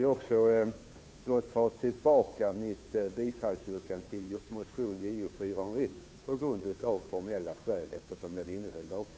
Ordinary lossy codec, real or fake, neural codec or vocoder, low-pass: none; fake; codec, 16 kHz, 2 kbps, FunCodec, trained on Chinese and English, 25 frames a second; none